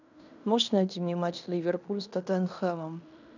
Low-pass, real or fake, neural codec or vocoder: 7.2 kHz; fake; codec, 16 kHz in and 24 kHz out, 0.9 kbps, LongCat-Audio-Codec, fine tuned four codebook decoder